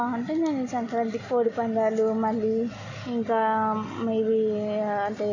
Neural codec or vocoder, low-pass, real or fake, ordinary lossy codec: none; 7.2 kHz; real; none